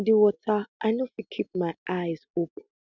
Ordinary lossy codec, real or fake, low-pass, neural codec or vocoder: none; real; 7.2 kHz; none